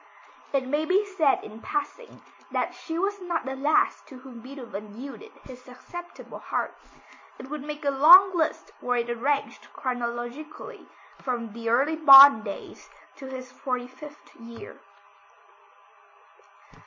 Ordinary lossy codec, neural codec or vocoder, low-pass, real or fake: MP3, 32 kbps; none; 7.2 kHz; real